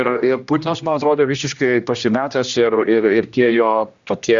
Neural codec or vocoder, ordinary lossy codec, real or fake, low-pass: codec, 16 kHz, 1 kbps, X-Codec, HuBERT features, trained on general audio; Opus, 64 kbps; fake; 7.2 kHz